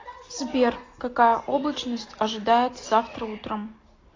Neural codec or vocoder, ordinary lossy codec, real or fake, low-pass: none; AAC, 32 kbps; real; 7.2 kHz